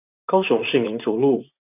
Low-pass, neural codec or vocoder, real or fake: 3.6 kHz; vocoder, 44.1 kHz, 128 mel bands, Pupu-Vocoder; fake